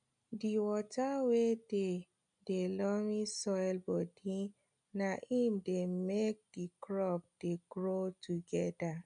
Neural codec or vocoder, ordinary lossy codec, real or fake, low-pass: none; none; real; 9.9 kHz